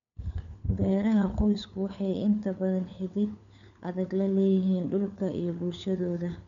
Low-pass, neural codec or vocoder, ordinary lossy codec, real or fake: 7.2 kHz; codec, 16 kHz, 4 kbps, FunCodec, trained on LibriTTS, 50 frames a second; none; fake